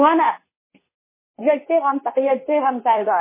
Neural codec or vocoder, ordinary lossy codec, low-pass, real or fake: codec, 24 kHz, 0.9 kbps, WavTokenizer, medium speech release version 2; MP3, 16 kbps; 3.6 kHz; fake